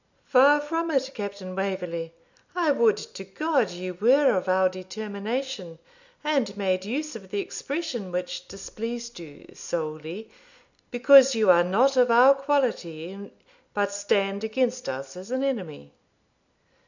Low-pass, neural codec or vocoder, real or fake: 7.2 kHz; none; real